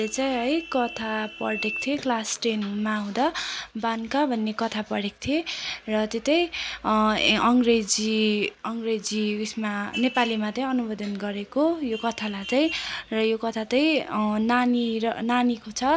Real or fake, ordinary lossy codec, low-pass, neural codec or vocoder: real; none; none; none